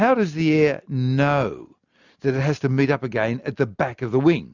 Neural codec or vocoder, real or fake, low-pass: none; real; 7.2 kHz